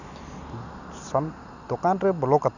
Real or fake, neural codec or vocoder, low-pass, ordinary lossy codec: real; none; 7.2 kHz; none